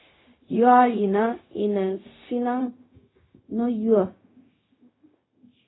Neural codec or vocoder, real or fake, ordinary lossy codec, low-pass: codec, 16 kHz, 0.4 kbps, LongCat-Audio-Codec; fake; AAC, 16 kbps; 7.2 kHz